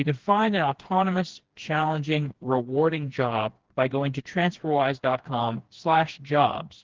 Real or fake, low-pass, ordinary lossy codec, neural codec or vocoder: fake; 7.2 kHz; Opus, 16 kbps; codec, 16 kHz, 2 kbps, FreqCodec, smaller model